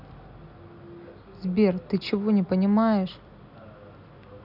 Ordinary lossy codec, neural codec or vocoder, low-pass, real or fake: none; none; 5.4 kHz; real